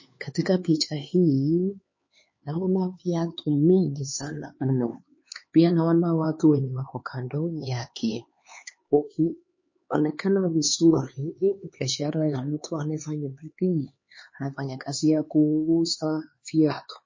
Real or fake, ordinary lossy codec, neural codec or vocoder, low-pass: fake; MP3, 32 kbps; codec, 16 kHz, 4 kbps, X-Codec, HuBERT features, trained on LibriSpeech; 7.2 kHz